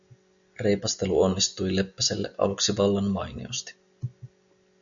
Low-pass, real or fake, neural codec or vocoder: 7.2 kHz; real; none